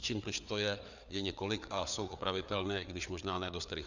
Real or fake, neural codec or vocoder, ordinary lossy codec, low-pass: fake; codec, 16 kHz, 4 kbps, FreqCodec, larger model; Opus, 64 kbps; 7.2 kHz